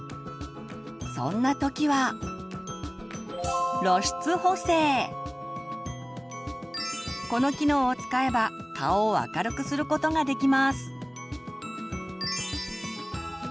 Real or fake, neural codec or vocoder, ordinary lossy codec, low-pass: real; none; none; none